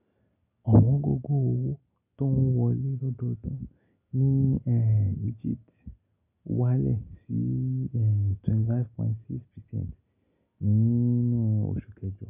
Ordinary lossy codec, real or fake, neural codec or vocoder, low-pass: none; real; none; 3.6 kHz